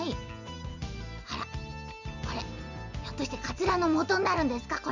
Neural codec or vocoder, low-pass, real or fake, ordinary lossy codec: none; 7.2 kHz; real; none